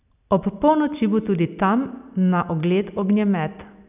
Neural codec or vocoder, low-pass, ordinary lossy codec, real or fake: none; 3.6 kHz; none; real